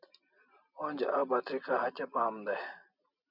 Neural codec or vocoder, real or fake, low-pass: none; real; 5.4 kHz